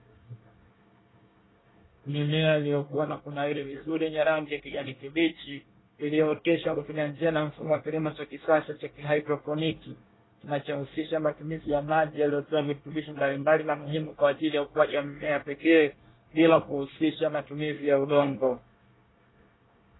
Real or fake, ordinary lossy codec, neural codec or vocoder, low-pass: fake; AAC, 16 kbps; codec, 24 kHz, 1 kbps, SNAC; 7.2 kHz